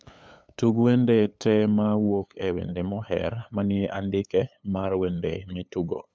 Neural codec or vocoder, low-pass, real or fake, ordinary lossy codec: codec, 16 kHz, 8 kbps, FunCodec, trained on LibriTTS, 25 frames a second; none; fake; none